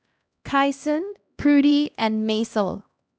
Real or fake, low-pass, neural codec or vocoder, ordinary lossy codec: fake; none; codec, 16 kHz, 1 kbps, X-Codec, HuBERT features, trained on LibriSpeech; none